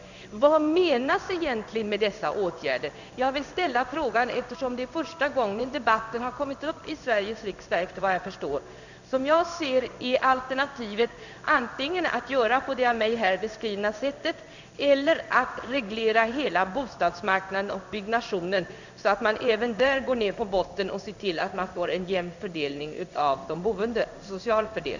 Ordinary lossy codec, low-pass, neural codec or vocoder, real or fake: none; 7.2 kHz; codec, 16 kHz in and 24 kHz out, 1 kbps, XY-Tokenizer; fake